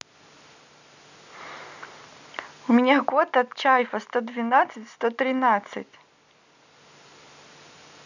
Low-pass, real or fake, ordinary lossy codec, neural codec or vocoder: 7.2 kHz; real; none; none